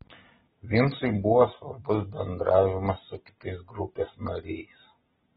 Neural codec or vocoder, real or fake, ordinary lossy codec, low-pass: none; real; AAC, 16 kbps; 19.8 kHz